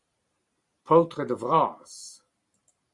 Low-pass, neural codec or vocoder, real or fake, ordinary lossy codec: 10.8 kHz; vocoder, 44.1 kHz, 128 mel bands every 512 samples, BigVGAN v2; fake; Opus, 64 kbps